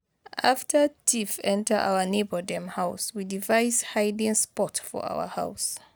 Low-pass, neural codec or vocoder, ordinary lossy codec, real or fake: none; none; none; real